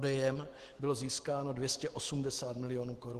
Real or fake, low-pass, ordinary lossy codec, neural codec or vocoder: fake; 14.4 kHz; Opus, 16 kbps; autoencoder, 48 kHz, 128 numbers a frame, DAC-VAE, trained on Japanese speech